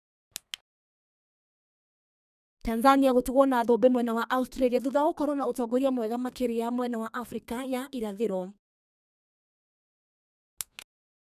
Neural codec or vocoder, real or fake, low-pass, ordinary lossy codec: codec, 32 kHz, 1.9 kbps, SNAC; fake; 14.4 kHz; none